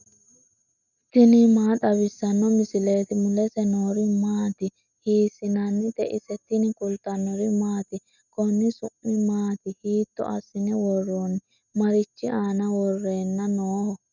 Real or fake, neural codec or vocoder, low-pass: real; none; 7.2 kHz